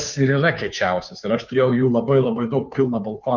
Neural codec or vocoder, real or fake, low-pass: codec, 16 kHz, 2 kbps, FunCodec, trained on Chinese and English, 25 frames a second; fake; 7.2 kHz